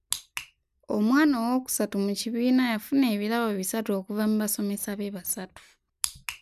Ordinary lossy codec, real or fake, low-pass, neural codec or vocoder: none; real; 14.4 kHz; none